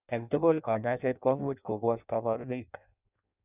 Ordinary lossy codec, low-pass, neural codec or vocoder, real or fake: none; 3.6 kHz; codec, 16 kHz in and 24 kHz out, 0.6 kbps, FireRedTTS-2 codec; fake